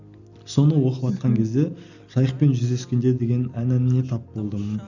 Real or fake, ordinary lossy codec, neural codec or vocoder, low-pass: real; none; none; 7.2 kHz